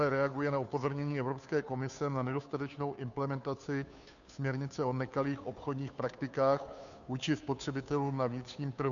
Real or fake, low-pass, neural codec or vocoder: fake; 7.2 kHz; codec, 16 kHz, 2 kbps, FunCodec, trained on Chinese and English, 25 frames a second